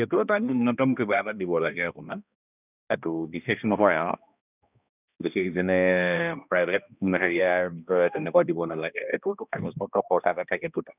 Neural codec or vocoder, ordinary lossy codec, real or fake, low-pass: codec, 16 kHz, 1 kbps, X-Codec, HuBERT features, trained on balanced general audio; none; fake; 3.6 kHz